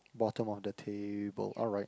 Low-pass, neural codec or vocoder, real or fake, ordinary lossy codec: none; none; real; none